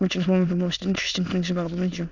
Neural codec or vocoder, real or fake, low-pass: autoencoder, 22.05 kHz, a latent of 192 numbers a frame, VITS, trained on many speakers; fake; 7.2 kHz